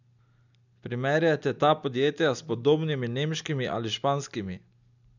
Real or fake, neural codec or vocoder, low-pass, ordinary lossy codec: real; none; 7.2 kHz; none